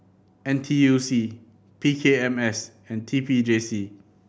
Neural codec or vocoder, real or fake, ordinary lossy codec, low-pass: none; real; none; none